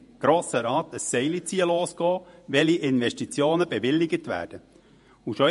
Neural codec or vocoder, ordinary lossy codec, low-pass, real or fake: vocoder, 48 kHz, 128 mel bands, Vocos; MP3, 48 kbps; 14.4 kHz; fake